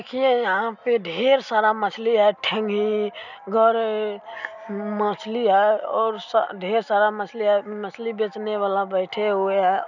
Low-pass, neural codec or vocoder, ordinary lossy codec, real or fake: 7.2 kHz; none; none; real